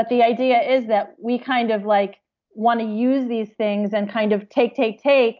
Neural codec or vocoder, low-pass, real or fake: none; 7.2 kHz; real